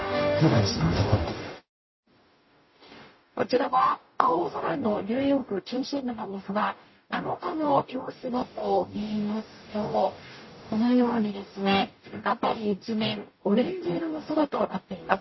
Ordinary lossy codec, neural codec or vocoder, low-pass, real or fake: MP3, 24 kbps; codec, 44.1 kHz, 0.9 kbps, DAC; 7.2 kHz; fake